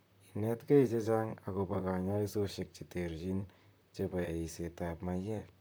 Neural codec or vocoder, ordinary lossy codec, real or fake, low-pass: vocoder, 44.1 kHz, 128 mel bands, Pupu-Vocoder; none; fake; none